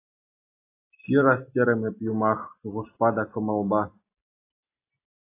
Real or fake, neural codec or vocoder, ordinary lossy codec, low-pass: real; none; AAC, 24 kbps; 3.6 kHz